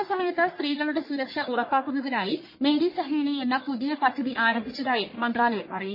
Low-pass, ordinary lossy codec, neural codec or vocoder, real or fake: 5.4 kHz; MP3, 24 kbps; codec, 44.1 kHz, 1.7 kbps, Pupu-Codec; fake